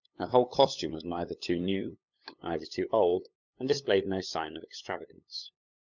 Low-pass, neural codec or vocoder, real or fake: 7.2 kHz; codec, 16 kHz, 8 kbps, FunCodec, trained on LibriTTS, 25 frames a second; fake